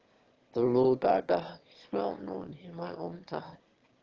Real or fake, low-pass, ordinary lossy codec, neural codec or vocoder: fake; 7.2 kHz; Opus, 16 kbps; autoencoder, 22.05 kHz, a latent of 192 numbers a frame, VITS, trained on one speaker